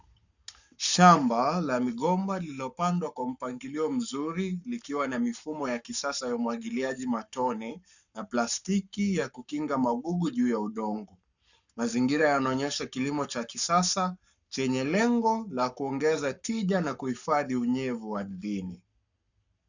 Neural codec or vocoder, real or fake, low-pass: codec, 44.1 kHz, 7.8 kbps, Pupu-Codec; fake; 7.2 kHz